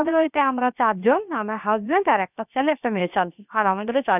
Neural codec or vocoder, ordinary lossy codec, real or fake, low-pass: codec, 16 kHz, about 1 kbps, DyCAST, with the encoder's durations; none; fake; 3.6 kHz